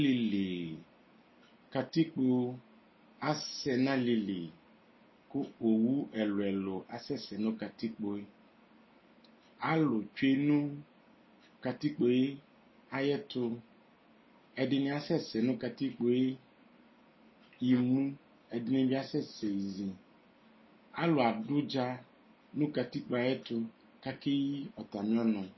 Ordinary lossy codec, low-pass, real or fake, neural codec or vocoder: MP3, 24 kbps; 7.2 kHz; real; none